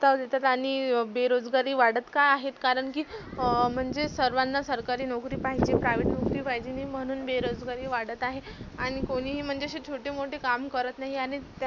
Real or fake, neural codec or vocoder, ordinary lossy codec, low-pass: real; none; none; 7.2 kHz